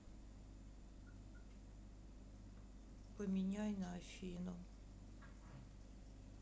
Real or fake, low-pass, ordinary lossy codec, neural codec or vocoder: real; none; none; none